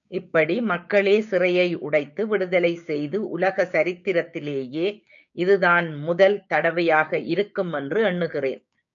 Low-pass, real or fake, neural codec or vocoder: 7.2 kHz; fake; codec, 16 kHz, 6 kbps, DAC